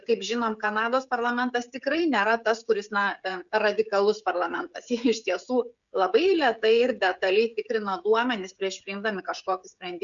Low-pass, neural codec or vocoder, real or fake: 7.2 kHz; codec, 16 kHz, 16 kbps, FreqCodec, smaller model; fake